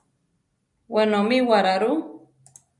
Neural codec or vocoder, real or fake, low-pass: none; real; 10.8 kHz